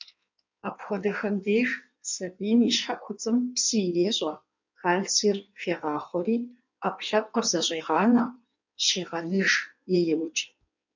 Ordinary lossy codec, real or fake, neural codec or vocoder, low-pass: MP3, 64 kbps; fake; codec, 16 kHz in and 24 kHz out, 1.1 kbps, FireRedTTS-2 codec; 7.2 kHz